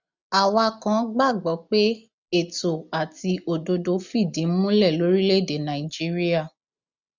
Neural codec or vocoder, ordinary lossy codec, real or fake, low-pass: none; none; real; 7.2 kHz